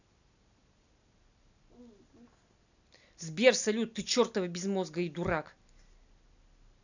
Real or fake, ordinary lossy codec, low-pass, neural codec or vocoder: real; none; 7.2 kHz; none